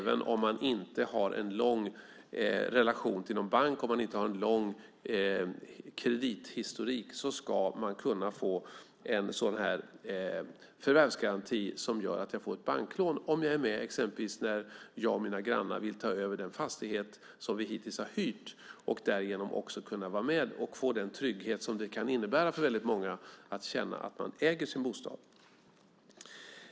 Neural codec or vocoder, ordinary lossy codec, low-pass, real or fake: none; none; none; real